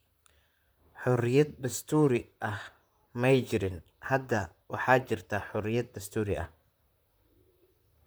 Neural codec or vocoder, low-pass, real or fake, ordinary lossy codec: vocoder, 44.1 kHz, 128 mel bands, Pupu-Vocoder; none; fake; none